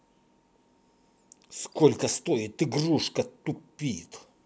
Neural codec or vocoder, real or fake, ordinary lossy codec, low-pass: none; real; none; none